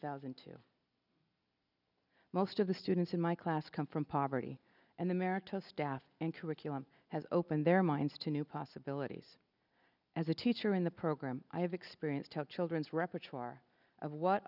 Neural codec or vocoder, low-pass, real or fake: none; 5.4 kHz; real